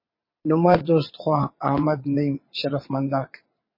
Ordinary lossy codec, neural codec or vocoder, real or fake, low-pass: MP3, 24 kbps; vocoder, 22.05 kHz, 80 mel bands, WaveNeXt; fake; 5.4 kHz